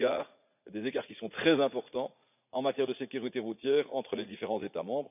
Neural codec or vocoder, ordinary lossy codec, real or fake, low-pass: vocoder, 44.1 kHz, 80 mel bands, Vocos; none; fake; 3.6 kHz